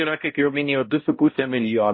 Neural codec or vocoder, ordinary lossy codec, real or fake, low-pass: codec, 16 kHz, 0.5 kbps, X-Codec, HuBERT features, trained on balanced general audio; MP3, 24 kbps; fake; 7.2 kHz